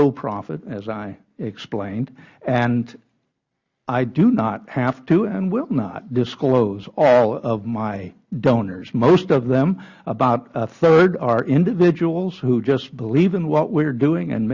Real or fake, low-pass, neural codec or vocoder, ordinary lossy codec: real; 7.2 kHz; none; Opus, 64 kbps